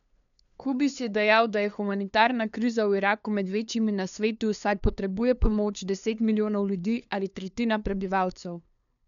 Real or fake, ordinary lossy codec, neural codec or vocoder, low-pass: fake; none; codec, 16 kHz, 2 kbps, FunCodec, trained on LibriTTS, 25 frames a second; 7.2 kHz